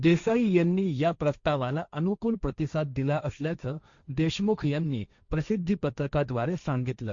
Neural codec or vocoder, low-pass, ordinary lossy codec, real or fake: codec, 16 kHz, 1.1 kbps, Voila-Tokenizer; 7.2 kHz; Opus, 64 kbps; fake